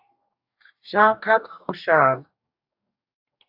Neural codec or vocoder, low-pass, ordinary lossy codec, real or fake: codec, 44.1 kHz, 2.6 kbps, DAC; 5.4 kHz; AAC, 48 kbps; fake